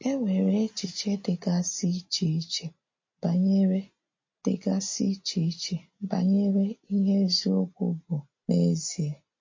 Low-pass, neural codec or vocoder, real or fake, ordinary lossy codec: 7.2 kHz; none; real; MP3, 32 kbps